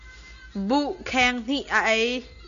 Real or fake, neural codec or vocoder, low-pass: real; none; 7.2 kHz